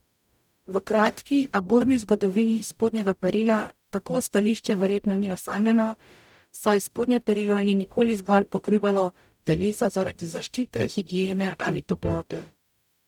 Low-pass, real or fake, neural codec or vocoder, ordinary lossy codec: 19.8 kHz; fake; codec, 44.1 kHz, 0.9 kbps, DAC; none